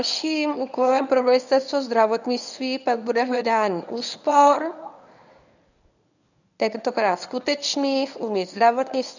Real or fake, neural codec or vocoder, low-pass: fake; codec, 24 kHz, 0.9 kbps, WavTokenizer, medium speech release version 1; 7.2 kHz